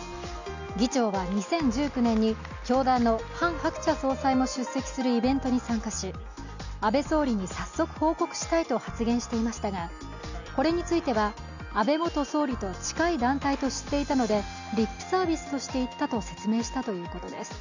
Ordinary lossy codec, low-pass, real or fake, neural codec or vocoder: none; 7.2 kHz; real; none